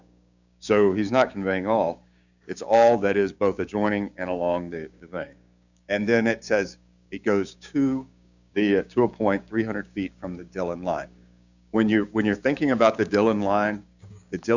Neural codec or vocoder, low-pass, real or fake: codec, 16 kHz, 6 kbps, DAC; 7.2 kHz; fake